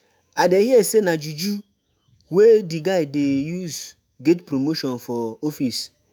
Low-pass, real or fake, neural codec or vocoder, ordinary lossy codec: none; fake; autoencoder, 48 kHz, 128 numbers a frame, DAC-VAE, trained on Japanese speech; none